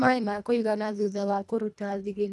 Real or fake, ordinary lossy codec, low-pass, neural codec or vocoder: fake; none; none; codec, 24 kHz, 1.5 kbps, HILCodec